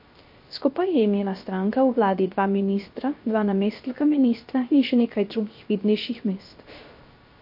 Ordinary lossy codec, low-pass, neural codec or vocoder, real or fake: MP3, 32 kbps; 5.4 kHz; codec, 16 kHz, 0.3 kbps, FocalCodec; fake